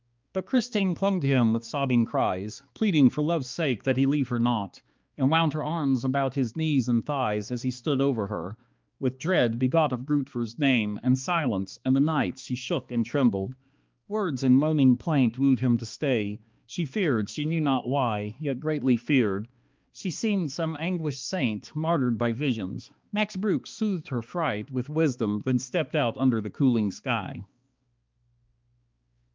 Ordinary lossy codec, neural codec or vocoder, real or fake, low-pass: Opus, 24 kbps; codec, 16 kHz, 2 kbps, X-Codec, HuBERT features, trained on balanced general audio; fake; 7.2 kHz